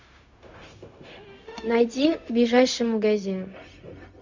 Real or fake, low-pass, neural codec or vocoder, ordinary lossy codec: fake; 7.2 kHz; codec, 16 kHz, 0.4 kbps, LongCat-Audio-Codec; Opus, 64 kbps